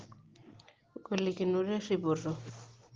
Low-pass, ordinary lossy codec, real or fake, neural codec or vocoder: 7.2 kHz; Opus, 16 kbps; real; none